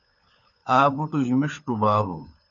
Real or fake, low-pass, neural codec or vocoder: fake; 7.2 kHz; codec, 16 kHz, 4 kbps, FunCodec, trained on LibriTTS, 50 frames a second